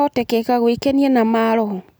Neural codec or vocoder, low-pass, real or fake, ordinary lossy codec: none; none; real; none